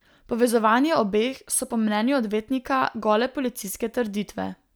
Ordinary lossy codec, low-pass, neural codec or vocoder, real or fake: none; none; none; real